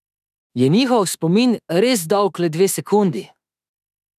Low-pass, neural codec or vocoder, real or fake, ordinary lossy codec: 14.4 kHz; autoencoder, 48 kHz, 32 numbers a frame, DAC-VAE, trained on Japanese speech; fake; none